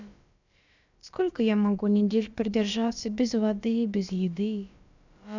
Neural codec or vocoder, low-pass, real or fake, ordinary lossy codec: codec, 16 kHz, about 1 kbps, DyCAST, with the encoder's durations; 7.2 kHz; fake; none